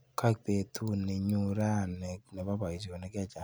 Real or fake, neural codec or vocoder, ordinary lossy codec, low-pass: real; none; none; none